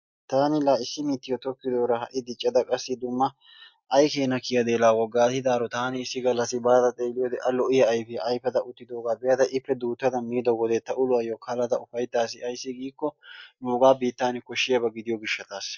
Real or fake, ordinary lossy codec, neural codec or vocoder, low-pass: real; MP3, 64 kbps; none; 7.2 kHz